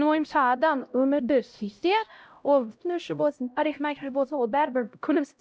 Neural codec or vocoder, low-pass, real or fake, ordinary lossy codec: codec, 16 kHz, 0.5 kbps, X-Codec, HuBERT features, trained on LibriSpeech; none; fake; none